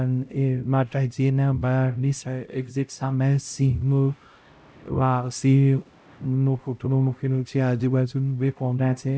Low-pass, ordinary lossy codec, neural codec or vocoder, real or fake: none; none; codec, 16 kHz, 0.5 kbps, X-Codec, HuBERT features, trained on LibriSpeech; fake